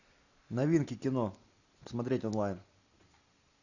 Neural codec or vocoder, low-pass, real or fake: none; 7.2 kHz; real